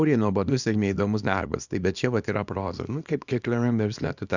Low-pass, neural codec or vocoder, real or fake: 7.2 kHz; codec, 24 kHz, 0.9 kbps, WavTokenizer, small release; fake